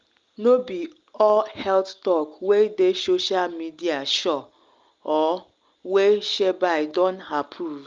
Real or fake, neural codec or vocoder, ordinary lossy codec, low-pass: real; none; Opus, 32 kbps; 7.2 kHz